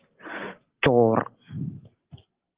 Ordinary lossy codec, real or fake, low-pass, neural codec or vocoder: Opus, 16 kbps; real; 3.6 kHz; none